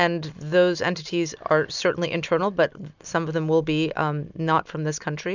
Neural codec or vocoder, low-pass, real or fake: none; 7.2 kHz; real